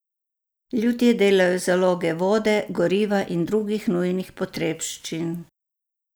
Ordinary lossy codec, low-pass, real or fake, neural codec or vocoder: none; none; real; none